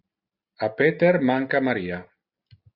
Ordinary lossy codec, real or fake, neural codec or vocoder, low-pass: Opus, 64 kbps; real; none; 5.4 kHz